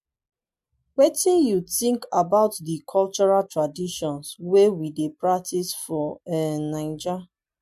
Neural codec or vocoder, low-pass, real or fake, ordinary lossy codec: none; 14.4 kHz; real; MP3, 64 kbps